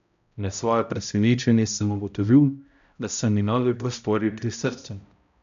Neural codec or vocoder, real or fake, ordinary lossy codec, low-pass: codec, 16 kHz, 0.5 kbps, X-Codec, HuBERT features, trained on balanced general audio; fake; AAC, 96 kbps; 7.2 kHz